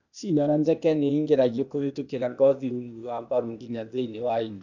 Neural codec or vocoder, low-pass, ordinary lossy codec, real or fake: codec, 16 kHz, 0.8 kbps, ZipCodec; 7.2 kHz; none; fake